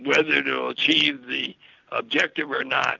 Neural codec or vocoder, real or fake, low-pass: none; real; 7.2 kHz